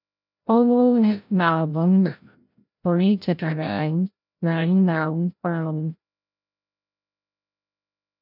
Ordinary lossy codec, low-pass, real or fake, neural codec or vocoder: none; 5.4 kHz; fake; codec, 16 kHz, 0.5 kbps, FreqCodec, larger model